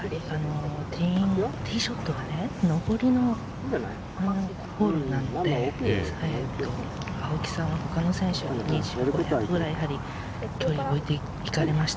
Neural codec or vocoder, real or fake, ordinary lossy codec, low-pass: none; real; none; none